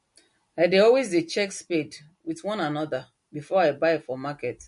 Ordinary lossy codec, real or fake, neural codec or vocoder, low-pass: MP3, 48 kbps; real; none; 10.8 kHz